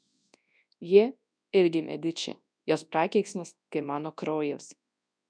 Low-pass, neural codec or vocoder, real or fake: 9.9 kHz; codec, 24 kHz, 0.9 kbps, WavTokenizer, large speech release; fake